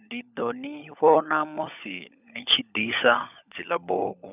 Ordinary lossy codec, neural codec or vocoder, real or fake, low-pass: none; codec, 16 kHz, 16 kbps, FunCodec, trained on LibriTTS, 50 frames a second; fake; 3.6 kHz